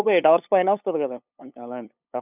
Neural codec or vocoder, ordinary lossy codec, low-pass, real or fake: codec, 16 kHz, 8 kbps, FunCodec, trained on LibriTTS, 25 frames a second; none; 3.6 kHz; fake